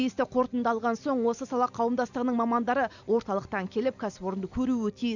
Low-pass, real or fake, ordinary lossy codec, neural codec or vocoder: 7.2 kHz; real; none; none